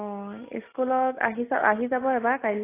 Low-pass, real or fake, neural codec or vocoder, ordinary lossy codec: 3.6 kHz; real; none; AAC, 24 kbps